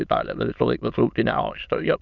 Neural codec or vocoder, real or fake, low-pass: autoencoder, 22.05 kHz, a latent of 192 numbers a frame, VITS, trained on many speakers; fake; 7.2 kHz